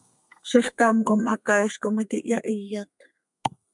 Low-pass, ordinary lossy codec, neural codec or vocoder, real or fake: 10.8 kHz; MP3, 96 kbps; codec, 32 kHz, 1.9 kbps, SNAC; fake